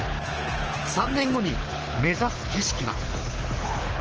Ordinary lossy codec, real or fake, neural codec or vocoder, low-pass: Opus, 16 kbps; fake; codec, 24 kHz, 6 kbps, HILCodec; 7.2 kHz